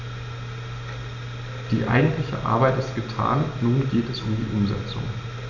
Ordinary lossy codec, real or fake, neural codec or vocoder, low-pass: none; real; none; 7.2 kHz